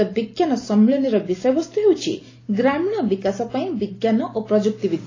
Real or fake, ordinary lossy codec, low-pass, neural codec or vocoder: real; AAC, 32 kbps; 7.2 kHz; none